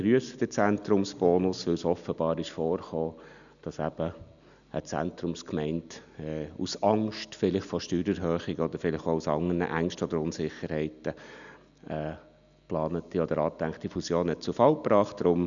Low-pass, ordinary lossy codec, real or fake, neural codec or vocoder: 7.2 kHz; none; real; none